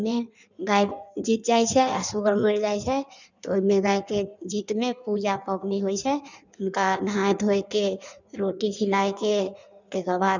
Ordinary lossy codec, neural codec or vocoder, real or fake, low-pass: none; codec, 16 kHz in and 24 kHz out, 1.1 kbps, FireRedTTS-2 codec; fake; 7.2 kHz